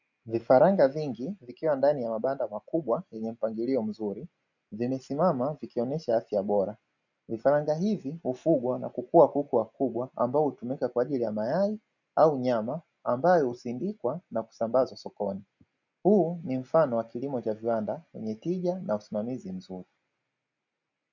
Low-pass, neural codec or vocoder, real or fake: 7.2 kHz; none; real